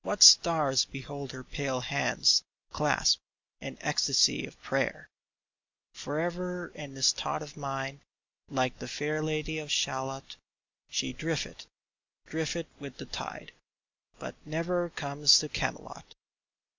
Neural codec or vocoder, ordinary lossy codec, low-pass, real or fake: none; MP3, 64 kbps; 7.2 kHz; real